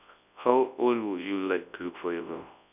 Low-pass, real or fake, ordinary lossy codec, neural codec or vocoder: 3.6 kHz; fake; none; codec, 24 kHz, 0.9 kbps, WavTokenizer, large speech release